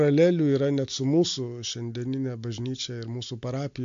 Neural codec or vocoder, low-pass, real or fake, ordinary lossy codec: none; 7.2 kHz; real; AAC, 64 kbps